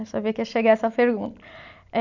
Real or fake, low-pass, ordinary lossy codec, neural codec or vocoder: real; 7.2 kHz; none; none